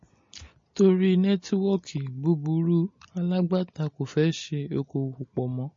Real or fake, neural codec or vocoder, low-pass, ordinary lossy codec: real; none; 7.2 kHz; MP3, 32 kbps